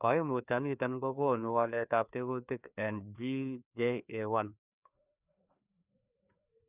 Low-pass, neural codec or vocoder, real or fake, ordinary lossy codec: 3.6 kHz; codec, 16 kHz, 2 kbps, FreqCodec, larger model; fake; none